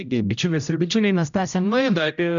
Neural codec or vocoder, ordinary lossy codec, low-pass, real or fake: codec, 16 kHz, 0.5 kbps, X-Codec, HuBERT features, trained on general audio; MP3, 96 kbps; 7.2 kHz; fake